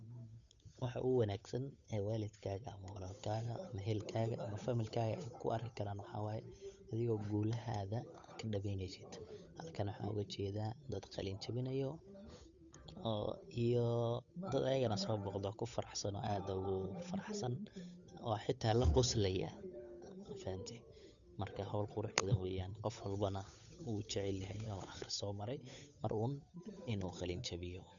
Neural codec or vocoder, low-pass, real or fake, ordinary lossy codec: codec, 16 kHz, 8 kbps, FunCodec, trained on Chinese and English, 25 frames a second; 7.2 kHz; fake; none